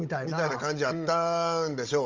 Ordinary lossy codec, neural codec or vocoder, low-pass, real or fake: Opus, 32 kbps; codec, 16 kHz, 16 kbps, FunCodec, trained on Chinese and English, 50 frames a second; 7.2 kHz; fake